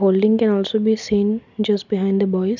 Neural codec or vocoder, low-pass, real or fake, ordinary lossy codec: none; 7.2 kHz; real; none